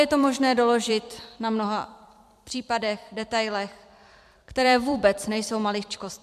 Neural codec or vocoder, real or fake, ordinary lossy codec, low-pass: vocoder, 44.1 kHz, 128 mel bands every 256 samples, BigVGAN v2; fake; MP3, 96 kbps; 14.4 kHz